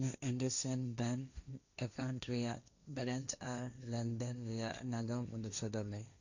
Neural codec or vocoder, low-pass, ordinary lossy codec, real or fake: codec, 16 kHz, 1.1 kbps, Voila-Tokenizer; 7.2 kHz; none; fake